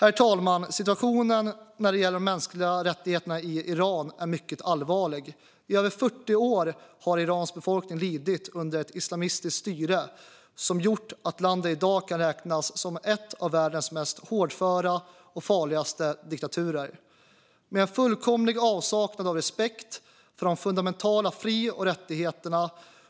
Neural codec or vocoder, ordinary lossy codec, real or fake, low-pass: none; none; real; none